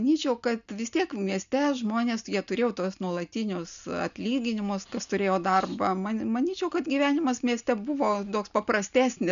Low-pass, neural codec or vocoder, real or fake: 7.2 kHz; none; real